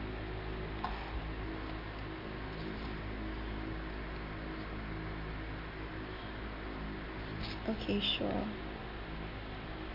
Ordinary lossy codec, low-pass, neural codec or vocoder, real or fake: MP3, 48 kbps; 5.4 kHz; none; real